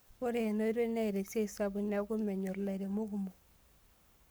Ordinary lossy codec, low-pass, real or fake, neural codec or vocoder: none; none; fake; codec, 44.1 kHz, 7.8 kbps, Pupu-Codec